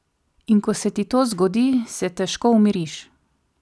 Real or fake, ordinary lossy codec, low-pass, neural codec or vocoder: real; none; none; none